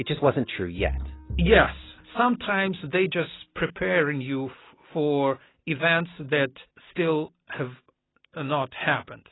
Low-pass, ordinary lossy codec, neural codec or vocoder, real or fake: 7.2 kHz; AAC, 16 kbps; none; real